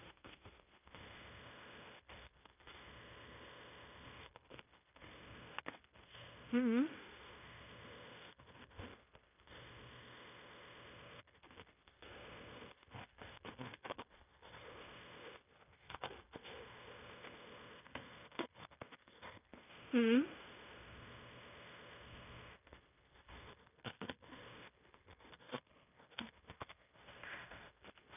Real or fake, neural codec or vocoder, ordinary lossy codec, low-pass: fake; codec, 16 kHz in and 24 kHz out, 0.9 kbps, LongCat-Audio-Codec, fine tuned four codebook decoder; none; 3.6 kHz